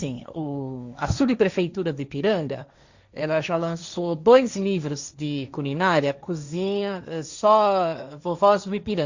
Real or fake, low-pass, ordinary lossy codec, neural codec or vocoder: fake; 7.2 kHz; Opus, 64 kbps; codec, 16 kHz, 1.1 kbps, Voila-Tokenizer